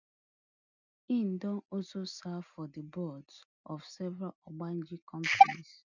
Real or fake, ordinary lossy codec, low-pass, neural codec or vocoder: real; none; 7.2 kHz; none